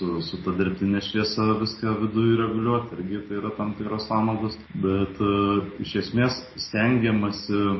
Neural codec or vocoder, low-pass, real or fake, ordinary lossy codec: none; 7.2 kHz; real; MP3, 24 kbps